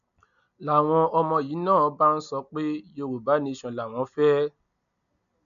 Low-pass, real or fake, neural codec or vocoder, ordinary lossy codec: 7.2 kHz; real; none; none